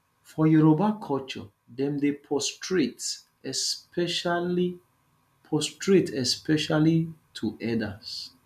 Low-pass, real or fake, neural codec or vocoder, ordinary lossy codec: 14.4 kHz; real; none; none